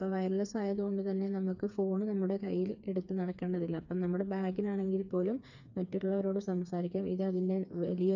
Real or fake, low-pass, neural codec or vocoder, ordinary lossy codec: fake; 7.2 kHz; codec, 16 kHz, 4 kbps, FreqCodec, smaller model; none